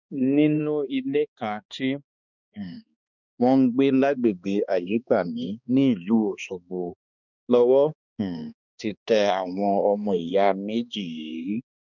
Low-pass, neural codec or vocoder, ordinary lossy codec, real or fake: 7.2 kHz; codec, 16 kHz, 2 kbps, X-Codec, HuBERT features, trained on balanced general audio; none; fake